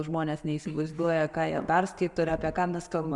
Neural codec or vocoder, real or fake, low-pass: none; real; 10.8 kHz